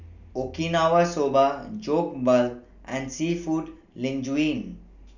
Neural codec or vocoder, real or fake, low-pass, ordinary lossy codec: none; real; 7.2 kHz; none